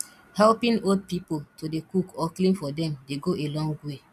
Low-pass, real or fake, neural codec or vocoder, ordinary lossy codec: 14.4 kHz; real; none; none